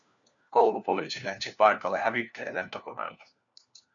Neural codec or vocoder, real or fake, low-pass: codec, 16 kHz, 1 kbps, FunCodec, trained on LibriTTS, 50 frames a second; fake; 7.2 kHz